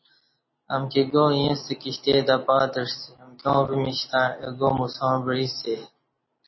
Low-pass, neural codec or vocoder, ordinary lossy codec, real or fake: 7.2 kHz; none; MP3, 24 kbps; real